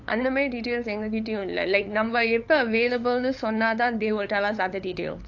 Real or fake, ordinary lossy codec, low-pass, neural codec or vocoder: fake; AAC, 48 kbps; 7.2 kHz; codec, 16 kHz, 8 kbps, FunCodec, trained on LibriTTS, 25 frames a second